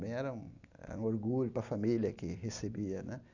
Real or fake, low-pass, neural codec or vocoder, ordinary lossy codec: real; 7.2 kHz; none; none